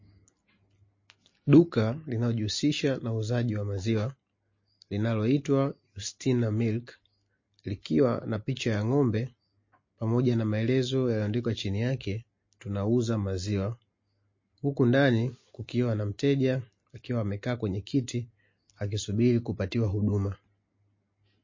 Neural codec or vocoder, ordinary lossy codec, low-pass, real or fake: none; MP3, 32 kbps; 7.2 kHz; real